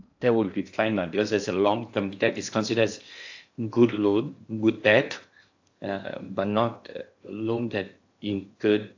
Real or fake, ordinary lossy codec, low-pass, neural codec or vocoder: fake; AAC, 48 kbps; 7.2 kHz; codec, 16 kHz in and 24 kHz out, 0.8 kbps, FocalCodec, streaming, 65536 codes